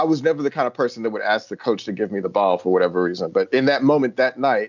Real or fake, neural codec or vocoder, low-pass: real; none; 7.2 kHz